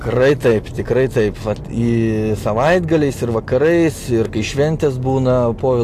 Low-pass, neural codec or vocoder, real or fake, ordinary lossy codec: 14.4 kHz; none; real; AAC, 64 kbps